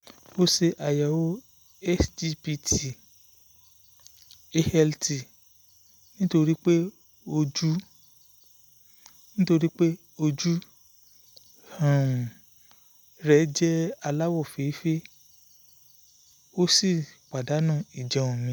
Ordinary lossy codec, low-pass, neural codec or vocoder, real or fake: none; none; none; real